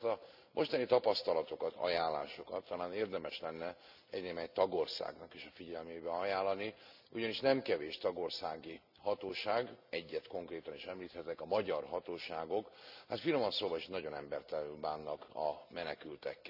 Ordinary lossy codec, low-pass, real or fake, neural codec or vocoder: none; 5.4 kHz; real; none